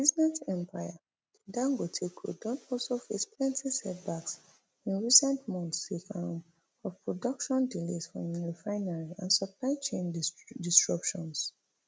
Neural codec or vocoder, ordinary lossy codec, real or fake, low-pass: none; none; real; none